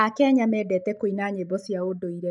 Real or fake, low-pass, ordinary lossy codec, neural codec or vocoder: real; 10.8 kHz; none; none